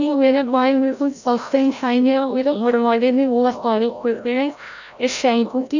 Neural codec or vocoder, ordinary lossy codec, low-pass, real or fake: codec, 16 kHz, 0.5 kbps, FreqCodec, larger model; none; 7.2 kHz; fake